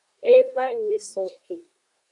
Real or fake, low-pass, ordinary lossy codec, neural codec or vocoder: fake; 10.8 kHz; MP3, 96 kbps; codec, 24 kHz, 1 kbps, SNAC